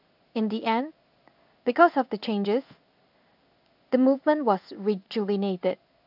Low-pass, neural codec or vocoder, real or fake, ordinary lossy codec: 5.4 kHz; codec, 16 kHz in and 24 kHz out, 1 kbps, XY-Tokenizer; fake; none